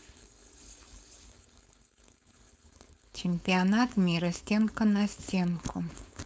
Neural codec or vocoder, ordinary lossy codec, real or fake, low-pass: codec, 16 kHz, 4.8 kbps, FACodec; none; fake; none